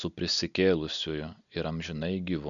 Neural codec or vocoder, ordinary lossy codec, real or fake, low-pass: none; MP3, 96 kbps; real; 7.2 kHz